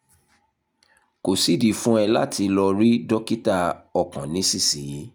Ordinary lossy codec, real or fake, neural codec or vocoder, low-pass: none; real; none; none